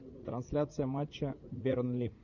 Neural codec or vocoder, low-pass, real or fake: vocoder, 44.1 kHz, 80 mel bands, Vocos; 7.2 kHz; fake